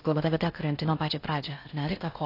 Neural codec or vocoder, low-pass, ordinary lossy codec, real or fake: codec, 16 kHz in and 24 kHz out, 0.6 kbps, FocalCodec, streaming, 4096 codes; 5.4 kHz; AAC, 32 kbps; fake